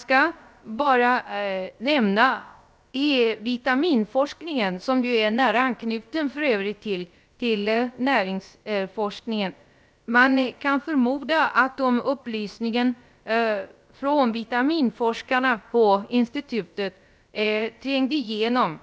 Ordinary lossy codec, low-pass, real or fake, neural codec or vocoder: none; none; fake; codec, 16 kHz, about 1 kbps, DyCAST, with the encoder's durations